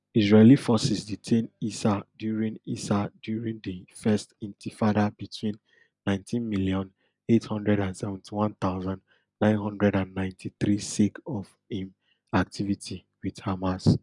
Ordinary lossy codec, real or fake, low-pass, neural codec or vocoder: none; real; 10.8 kHz; none